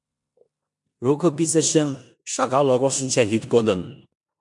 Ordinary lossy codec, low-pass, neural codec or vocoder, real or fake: MP3, 64 kbps; 10.8 kHz; codec, 16 kHz in and 24 kHz out, 0.9 kbps, LongCat-Audio-Codec, four codebook decoder; fake